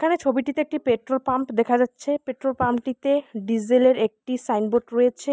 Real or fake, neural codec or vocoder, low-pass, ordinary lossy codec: real; none; none; none